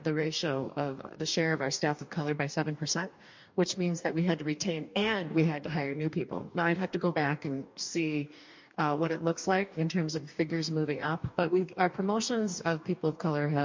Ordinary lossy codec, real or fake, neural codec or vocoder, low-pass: MP3, 48 kbps; fake; codec, 44.1 kHz, 2.6 kbps, DAC; 7.2 kHz